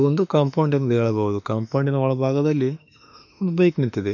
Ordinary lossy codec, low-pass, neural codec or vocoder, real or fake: none; 7.2 kHz; autoencoder, 48 kHz, 32 numbers a frame, DAC-VAE, trained on Japanese speech; fake